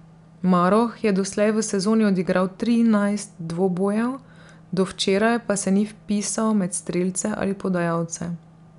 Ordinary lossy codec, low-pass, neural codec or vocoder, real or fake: none; 10.8 kHz; none; real